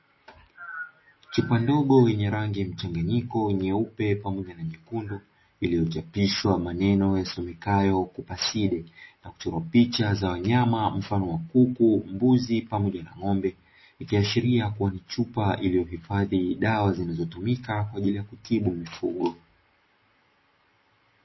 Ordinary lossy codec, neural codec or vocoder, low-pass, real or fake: MP3, 24 kbps; none; 7.2 kHz; real